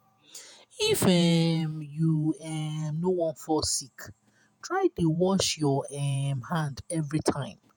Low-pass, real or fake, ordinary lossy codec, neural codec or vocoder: none; fake; none; vocoder, 48 kHz, 128 mel bands, Vocos